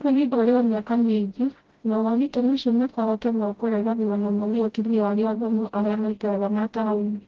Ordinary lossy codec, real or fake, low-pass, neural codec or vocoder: Opus, 16 kbps; fake; 7.2 kHz; codec, 16 kHz, 0.5 kbps, FreqCodec, smaller model